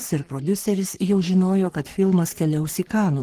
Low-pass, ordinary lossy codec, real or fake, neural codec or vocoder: 14.4 kHz; Opus, 16 kbps; fake; codec, 44.1 kHz, 3.4 kbps, Pupu-Codec